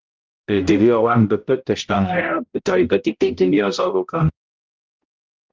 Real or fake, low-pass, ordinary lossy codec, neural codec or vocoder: fake; 7.2 kHz; Opus, 32 kbps; codec, 16 kHz, 0.5 kbps, X-Codec, HuBERT features, trained on balanced general audio